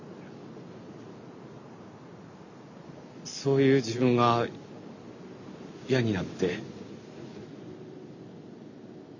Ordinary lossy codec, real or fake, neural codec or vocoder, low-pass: none; real; none; 7.2 kHz